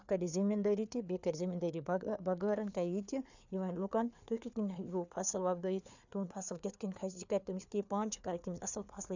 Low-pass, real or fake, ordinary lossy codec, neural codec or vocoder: 7.2 kHz; fake; none; codec, 16 kHz, 4 kbps, FreqCodec, larger model